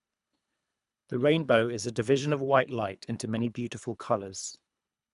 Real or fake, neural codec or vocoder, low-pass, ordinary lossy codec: fake; codec, 24 kHz, 3 kbps, HILCodec; 10.8 kHz; none